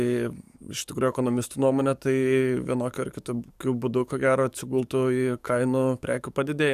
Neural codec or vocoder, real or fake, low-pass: codec, 44.1 kHz, 7.8 kbps, DAC; fake; 14.4 kHz